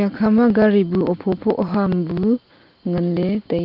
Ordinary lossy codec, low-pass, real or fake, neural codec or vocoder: Opus, 32 kbps; 5.4 kHz; real; none